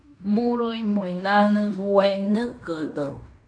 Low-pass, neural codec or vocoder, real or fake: 9.9 kHz; codec, 16 kHz in and 24 kHz out, 0.9 kbps, LongCat-Audio-Codec, fine tuned four codebook decoder; fake